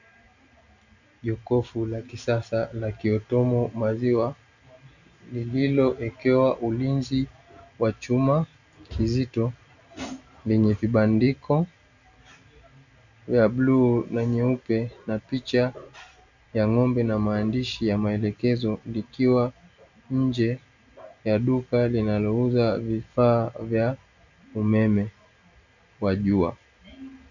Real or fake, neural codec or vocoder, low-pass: real; none; 7.2 kHz